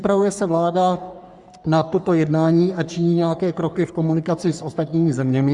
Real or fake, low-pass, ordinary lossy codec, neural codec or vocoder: fake; 10.8 kHz; Opus, 64 kbps; codec, 44.1 kHz, 3.4 kbps, Pupu-Codec